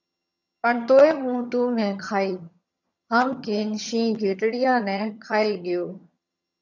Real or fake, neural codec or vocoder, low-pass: fake; vocoder, 22.05 kHz, 80 mel bands, HiFi-GAN; 7.2 kHz